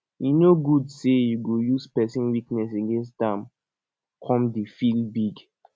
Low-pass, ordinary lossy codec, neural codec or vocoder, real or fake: none; none; none; real